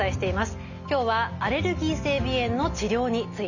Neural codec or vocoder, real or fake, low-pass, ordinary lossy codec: none; real; 7.2 kHz; none